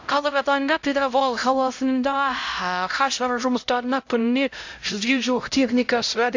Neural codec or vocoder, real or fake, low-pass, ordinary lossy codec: codec, 16 kHz, 0.5 kbps, X-Codec, HuBERT features, trained on LibriSpeech; fake; 7.2 kHz; AAC, 48 kbps